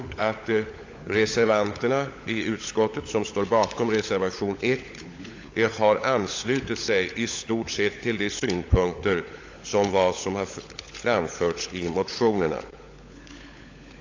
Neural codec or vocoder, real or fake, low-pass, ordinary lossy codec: codec, 16 kHz, 16 kbps, FunCodec, trained on LibriTTS, 50 frames a second; fake; 7.2 kHz; AAC, 48 kbps